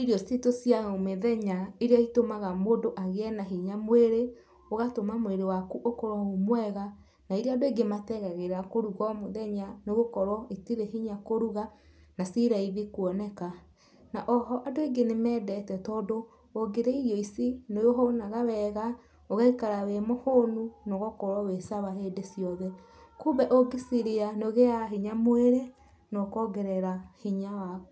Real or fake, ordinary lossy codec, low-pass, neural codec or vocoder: real; none; none; none